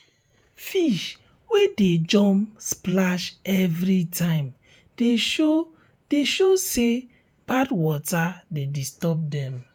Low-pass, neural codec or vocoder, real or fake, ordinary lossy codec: none; vocoder, 48 kHz, 128 mel bands, Vocos; fake; none